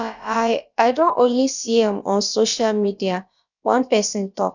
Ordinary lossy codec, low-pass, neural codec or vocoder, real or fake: none; 7.2 kHz; codec, 16 kHz, about 1 kbps, DyCAST, with the encoder's durations; fake